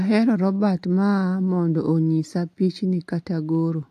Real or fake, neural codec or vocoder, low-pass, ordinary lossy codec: real; none; 14.4 kHz; AAC, 64 kbps